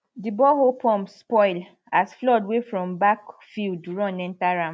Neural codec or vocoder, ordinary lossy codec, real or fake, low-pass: none; none; real; none